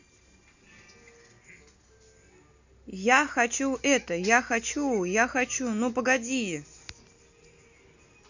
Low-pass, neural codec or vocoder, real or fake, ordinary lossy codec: 7.2 kHz; none; real; none